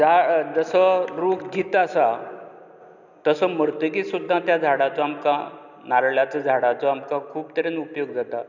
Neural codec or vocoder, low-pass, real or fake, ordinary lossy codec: none; 7.2 kHz; real; none